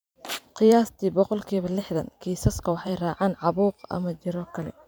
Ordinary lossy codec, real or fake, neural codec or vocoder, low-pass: none; fake; vocoder, 44.1 kHz, 128 mel bands every 512 samples, BigVGAN v2; none